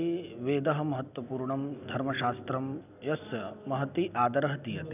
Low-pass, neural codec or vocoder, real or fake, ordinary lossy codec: 3.6 kHz; none; real; none